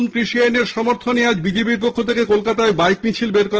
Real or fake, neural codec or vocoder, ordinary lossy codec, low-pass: real; none; Opus, 16 kbps; 7.2 kHz